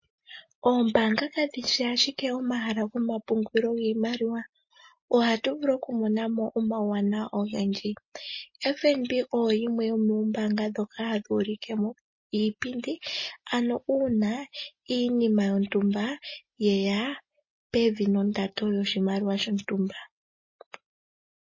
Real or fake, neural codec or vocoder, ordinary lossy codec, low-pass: real; none; MP3, 32 kbps; 7.2 kHz